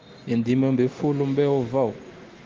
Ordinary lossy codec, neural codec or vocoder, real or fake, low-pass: Opus, 32 kbps; none; real; 7.2 kHz